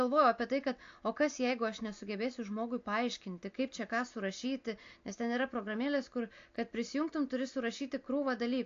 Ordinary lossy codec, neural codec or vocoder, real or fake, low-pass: AAC, 48 kbps; none; real; 7.2 kHz